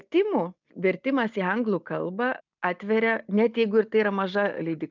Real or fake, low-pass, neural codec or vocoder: real; 7.2 kHz; none